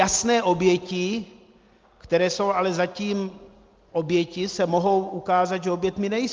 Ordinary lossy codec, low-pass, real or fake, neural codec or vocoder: Opus, 32 kbps; 7.2 kHz; real; none